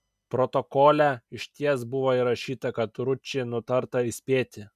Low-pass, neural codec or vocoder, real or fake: 14.4 kHz; none; real